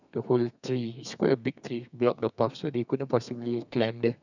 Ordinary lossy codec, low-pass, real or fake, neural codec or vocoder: none; 7.2 kHz; fake; codec, 32 kHz, 1.9 kbps, SNAC